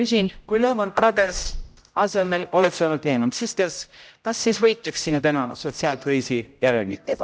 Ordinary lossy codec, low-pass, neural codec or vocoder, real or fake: none; none; codec, 16 kHz, 0.5 kbps, X-Codec, HuBERT features, trained on general audio; fake